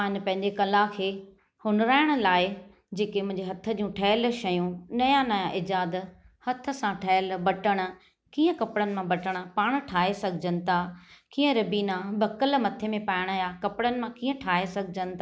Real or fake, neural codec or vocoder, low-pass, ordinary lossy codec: real; none; none; none